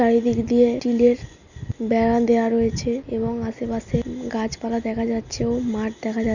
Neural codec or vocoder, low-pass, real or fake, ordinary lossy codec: none; 7.2 kHz; real; none